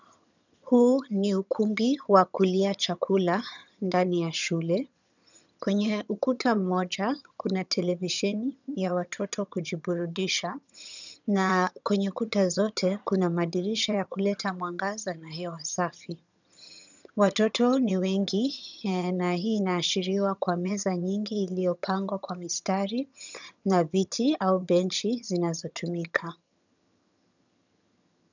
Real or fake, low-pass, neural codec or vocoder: fake; 7.2 kHz; vocoder, 22.05 kHz, 80 mel bands, HiFi-GAN